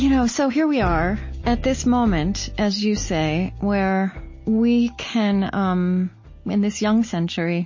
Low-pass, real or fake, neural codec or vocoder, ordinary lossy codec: 7.2 kHz; real; none; MP3, 32 kbps